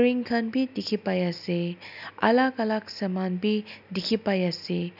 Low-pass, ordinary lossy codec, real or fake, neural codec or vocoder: 5.4 kHz; none; real; none